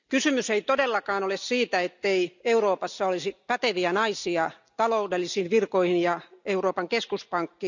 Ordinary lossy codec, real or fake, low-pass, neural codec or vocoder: none; real; 7.2 kHz; none